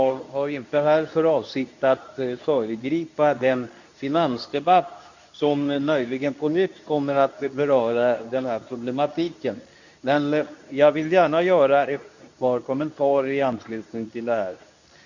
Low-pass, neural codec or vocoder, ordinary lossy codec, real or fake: 7.2 kHz; codec, 24 kHz, 0.9 kbps, WavTokenizer, medium speech release version 2; none; fake